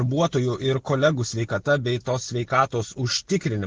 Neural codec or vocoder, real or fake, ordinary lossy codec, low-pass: none; real; Opus, 16 kbps; 7.2 kHz